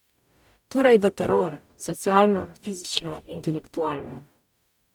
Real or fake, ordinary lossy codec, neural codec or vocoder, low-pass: fake; none; codec, 44.1 kHz, 0.9 kbps, DAC; 19.8 kHz